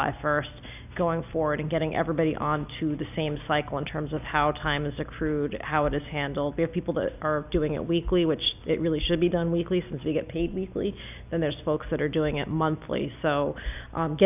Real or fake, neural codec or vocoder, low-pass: real; none; 3.6 kHz